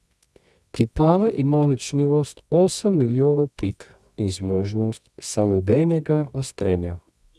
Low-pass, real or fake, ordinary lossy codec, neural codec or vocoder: none; fake; none; codec, 24 kHz, 0.9 kbps, WavTokenizer, medium music audio release